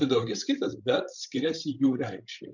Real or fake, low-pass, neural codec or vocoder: fake; 7.2 kHz; codec, 16 kHz, 16 kbps, FreqCodec, larger model